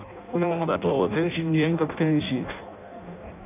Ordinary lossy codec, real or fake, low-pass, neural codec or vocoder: none; fake; 3.6 kHz; codec, 16 kHz in and 24 kHz out, 0.6 kbps, FireRedTTS-2 codec